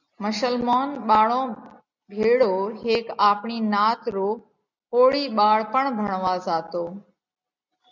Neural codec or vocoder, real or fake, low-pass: none; real; 7.2 kHz